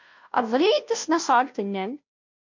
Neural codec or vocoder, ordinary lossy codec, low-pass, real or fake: codec, 16 kHz, 0.5 kbps, FunCodec, trained on Chinese and English, 25 frames a second; MP3, 48 kbps; 7.2 kHz; fake